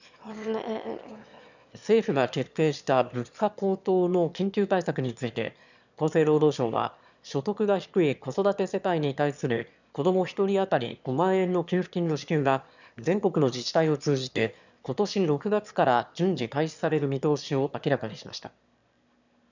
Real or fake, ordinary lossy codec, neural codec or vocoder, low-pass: fake; none; autoencoder, 22.05 kHz, a latent of 192 numbers a frame, VITS, trained on one speaker; 7.2 kHz